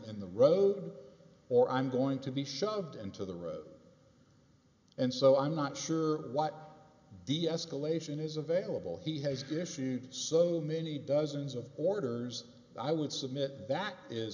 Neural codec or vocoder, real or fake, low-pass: none; real; 7.2 kHz